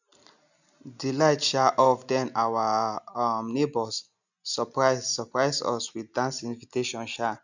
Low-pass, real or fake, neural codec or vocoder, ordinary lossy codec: 7.2 kHz; real; none; none